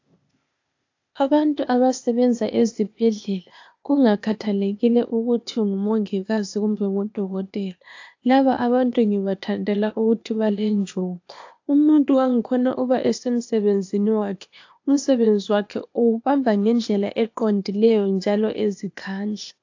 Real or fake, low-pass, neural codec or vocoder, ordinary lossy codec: fake; 7.2 kHz; codec, 16 kHz, 0.8 kbps, ZipCodec; AAC, 48 kbps